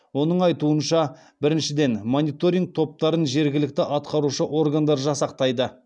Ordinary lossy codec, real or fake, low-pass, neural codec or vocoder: none; real; none; none